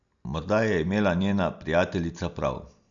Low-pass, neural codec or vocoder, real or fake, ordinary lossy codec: 7.2 kHz; none; real; none